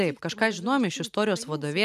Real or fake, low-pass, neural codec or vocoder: real; 14.4 kHz; none